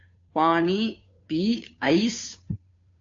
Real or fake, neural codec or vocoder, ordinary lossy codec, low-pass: fake; codec, 16 kHz, 2 kbps, FunCodec, trained on Chinese and English, 25 frames a second; AAC, 48 kbps; 7.2 kHz